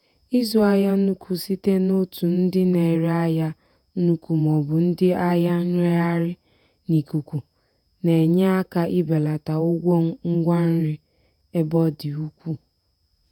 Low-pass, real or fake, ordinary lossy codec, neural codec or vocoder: 19.8 kHz; fake; none; vocoder, 48 kHz, 128 mel bands, Vocos